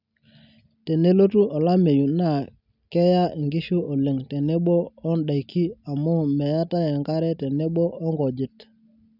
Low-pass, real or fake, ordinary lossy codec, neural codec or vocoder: 5.4 kHz; real; none; none